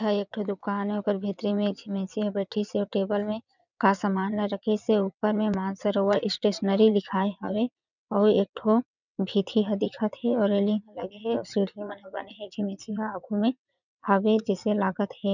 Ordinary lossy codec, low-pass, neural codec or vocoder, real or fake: none; 7.2 kHz; vocoder, 22.05 kHz, 80 mel bands, WaveNeXt; fake